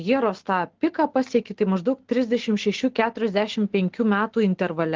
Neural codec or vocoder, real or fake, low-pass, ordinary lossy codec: none; real; 7.2 kHz; Opus, 16 kbps